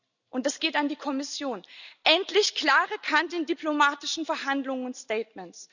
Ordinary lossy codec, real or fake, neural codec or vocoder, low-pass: none; real; none; 7.2 kHz